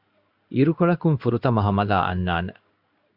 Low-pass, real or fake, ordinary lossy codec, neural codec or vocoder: 5.4 kHz; fake; Opus, 64 kbps; codec, 16 kHz in and 24 kHz out, 1 kbps, XY-Tokenizer